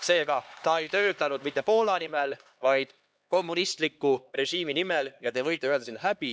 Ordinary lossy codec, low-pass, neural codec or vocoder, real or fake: none; none; codec, 16 kHz, 2 kbps, X-Codec, HuBERT features, trained on LibriSpeech; fake